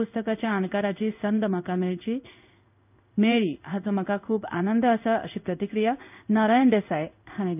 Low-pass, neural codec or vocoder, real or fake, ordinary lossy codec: 3.6 kHz; codec, 16 kHz in and 24 kHz out, 1 kbps, XY-Tokenizer; fake; none